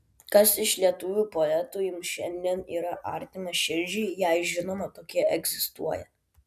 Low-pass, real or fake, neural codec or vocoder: 14.4 kHz; real; none